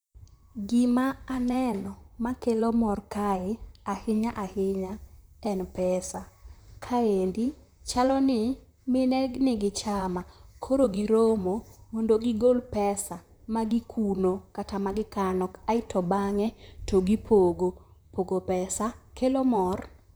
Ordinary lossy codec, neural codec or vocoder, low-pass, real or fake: none; vocoder, 44.1 kHz, 128 mel bands, Pupu-Vocoder; none; fake